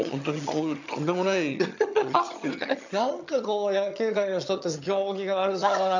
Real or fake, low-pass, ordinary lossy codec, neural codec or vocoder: fake; 7.2 kHz; none; vocoder, 22.05 kHz, 80 mel bands, HiFi-GAN